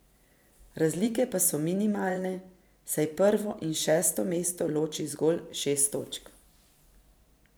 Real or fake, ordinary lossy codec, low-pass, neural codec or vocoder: fake; none; none; vocoder, 44.1 kHz, 128 mel bands every 512 samples, BigVGAN v2